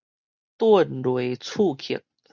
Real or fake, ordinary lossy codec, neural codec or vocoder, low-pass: real; Opus, 64 kbps; none; 7.2 kHz